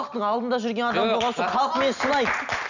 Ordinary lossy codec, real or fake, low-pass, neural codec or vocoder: none; real; 7.2 kHz; none